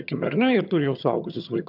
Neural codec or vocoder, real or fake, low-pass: vocoder, 22.05 kHz, 80 mel bands, HiFi-GAN; fake; 5.4 kHz